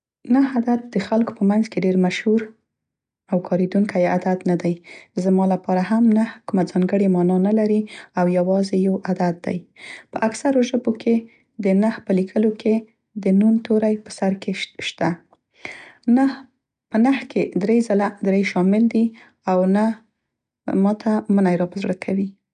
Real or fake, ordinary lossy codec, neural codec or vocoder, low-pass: real; none; none; 9.9 kHz